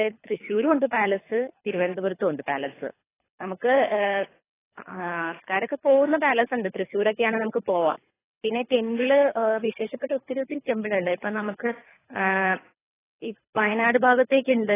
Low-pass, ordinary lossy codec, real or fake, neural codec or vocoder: 3.6 kHz; AAC, 16 kbps; fake; codec, 24 kHz, 3 kbps, HILCodec